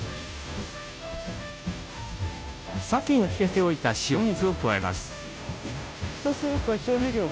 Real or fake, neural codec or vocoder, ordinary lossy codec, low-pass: fake; codec, 16 kHz, 0.5 kbps, FunCodec, trained on Chinese and English, 25 frames a second; none; none